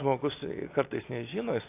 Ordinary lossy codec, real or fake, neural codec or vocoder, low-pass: AAC, 24 kbps; real; none; 3.6 kHz